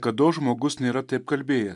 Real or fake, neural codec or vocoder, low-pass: real; none; 10.8 kHz